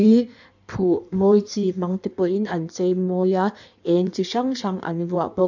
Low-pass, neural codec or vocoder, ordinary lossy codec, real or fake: 7.2 kHz; codec, 16 kHz in and 24 kHz out, 1.1 kbps, FireRedTTS-2 codec; none; fake